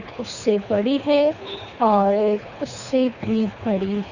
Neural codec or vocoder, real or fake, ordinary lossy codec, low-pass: codec, 24 kHz, 3 kbps, HILCodec; fake; none; 7.2 kHz